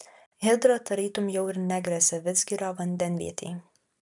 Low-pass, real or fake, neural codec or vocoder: 10.8 kHz; real; none